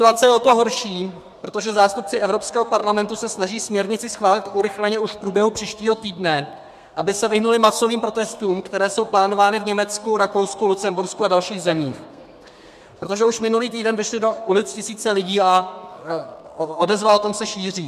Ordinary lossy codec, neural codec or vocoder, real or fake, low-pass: MP3, 96 kbps; codec, 32 kHz, 1.9 kbps, SNAC; fake; 14.4 kHz